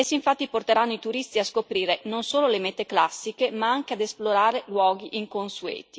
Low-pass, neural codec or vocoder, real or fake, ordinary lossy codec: none; none; real; none